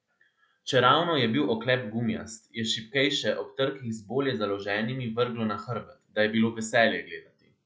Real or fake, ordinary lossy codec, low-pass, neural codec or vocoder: real; none; none; none